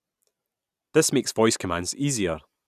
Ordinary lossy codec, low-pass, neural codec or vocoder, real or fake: none; 14.4 kHz; none; real